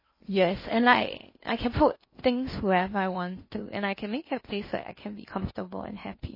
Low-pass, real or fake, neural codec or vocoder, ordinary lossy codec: 5.4 kHz; fake; codec, 16 kHz in and 24 kHz out, 0.8 kbps, FocalCodec, streaming, 65536 codes; MP3, 24 kbps